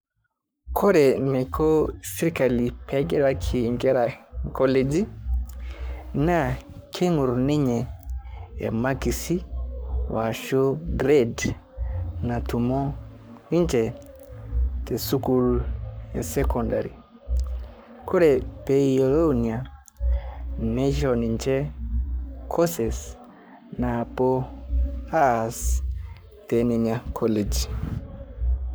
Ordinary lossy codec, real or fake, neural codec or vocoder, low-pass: none; fake; codec, 44.1 kHz, 7.8 kbps, Pupu-Codec; none